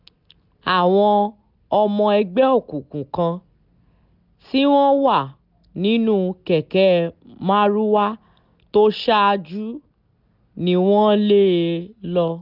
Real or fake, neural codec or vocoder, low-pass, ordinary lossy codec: real; none; 5.4 kHz; none